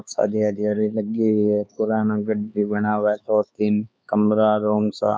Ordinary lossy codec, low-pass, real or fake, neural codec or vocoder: none; none; fake; codec, 16 kHz, 4 kbps, X-Codec, HuBERT features, trained on LibriSpeech